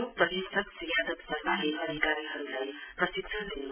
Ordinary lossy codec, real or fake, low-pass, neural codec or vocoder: none; real; 3.6 kHz; none